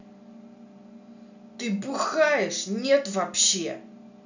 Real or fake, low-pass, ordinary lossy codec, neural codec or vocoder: real; 7.2 kHz; none; none